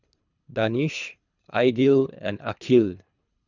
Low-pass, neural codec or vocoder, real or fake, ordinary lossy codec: 7.2 kHz; codec, 24 kHz, 3 kbps, HILCodec; fake; none